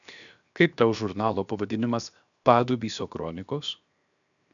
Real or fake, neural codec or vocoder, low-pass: fake; codec, 16 kHz, 0.7 kbps, FocalCodec; 7.2 kHz